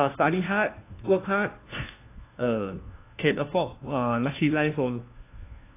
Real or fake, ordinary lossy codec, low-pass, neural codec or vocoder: fake; AAC, 24 kbps; 3.6 kHz; codec, 16 kHz, 1 kbps, FunCodec, trained on LibriTTS, 50 frames a second